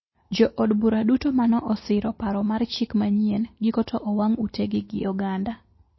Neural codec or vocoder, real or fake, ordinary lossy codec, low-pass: none; real; MP3, 24 kbps; 7.2 kHz